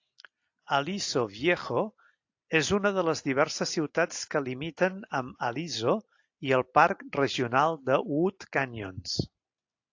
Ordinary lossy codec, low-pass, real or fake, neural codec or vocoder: AAC, 48 kbps; 7.2 kHz; real; none